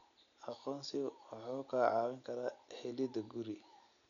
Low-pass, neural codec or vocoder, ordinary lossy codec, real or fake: 7.2 kHz; none; none; real